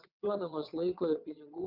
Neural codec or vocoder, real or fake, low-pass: vocoder, 22.05 kHz, 80 mel bands, WaveNeXt; fake; 5.4 kHz